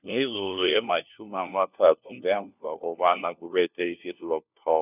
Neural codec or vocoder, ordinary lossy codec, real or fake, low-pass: codec, 16 kHz, 1 kbps, FunCodec, trained on LibriTTS, 50 frames a second; none; fake; 3.6 kHz